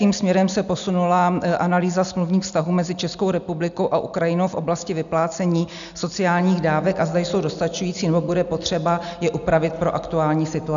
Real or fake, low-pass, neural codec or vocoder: real; 7.2 kHz; none